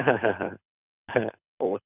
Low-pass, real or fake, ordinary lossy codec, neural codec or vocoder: 3.6 kHz; fake; none; codec, 24 kHz, 6 kbps, HILCodec